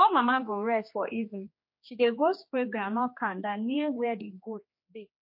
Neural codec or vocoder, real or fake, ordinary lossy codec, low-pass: codec, 16 kHz, 2 kbps, X-Codec, HuBERT features, trained on general audio; fake; MP3, 32 kbps; 5.4 kHz